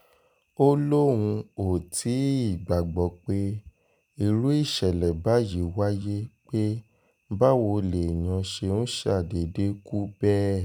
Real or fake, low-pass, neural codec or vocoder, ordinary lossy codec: real; none; none; none